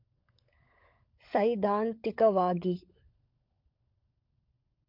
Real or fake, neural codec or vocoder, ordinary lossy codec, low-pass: fake; codec, 16 kHz, 16 kbps, FunCodec, trained on LibriTTS, 50 frames a second; MP3, 48 kbps; 5.4 kHz